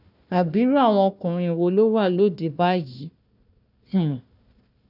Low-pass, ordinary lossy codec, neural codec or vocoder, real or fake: 5.4 kHz; none; codec, 16 kHz, 1 kbps, FunCodec, trained on Chinese and English, 50 frames a second; fake